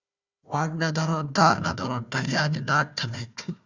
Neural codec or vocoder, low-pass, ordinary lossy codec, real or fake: codec, 16 kHz, 1 kbps, FunCodec, trained on Chinese and English, 50 frames a second; 7.2 kHz; Opus, 64 kbps; fake